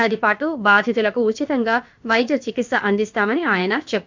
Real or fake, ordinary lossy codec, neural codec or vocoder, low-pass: fake; MP3, 64 kbps; codec, 16 kHz, about 1 kbps, DyCAST, with the encoder's durations; 7.2 kHz